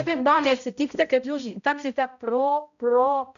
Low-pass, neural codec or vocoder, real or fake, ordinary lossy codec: 7.2 kHz; codec, 16 kHz, 0.5 kbps, X-Codec, HuBERT features, trained on balanced general audio; fake; MP3, 96 kbps